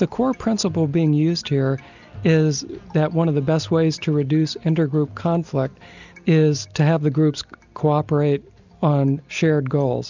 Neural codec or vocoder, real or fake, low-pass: none; real; 7.2 kHz